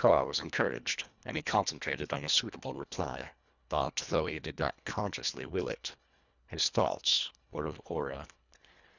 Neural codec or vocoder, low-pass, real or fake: codec, 24 kHz, 1.5 kbps, HILCodec; 7.2 kHz; fake